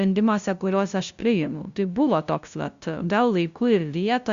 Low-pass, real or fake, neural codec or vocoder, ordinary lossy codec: 7.2 kHz; fake; codec, 16 kHz, 0.5 kbps, FunCodec, trained on LibriTTS, 25 frames a second; Opus, 64 kbps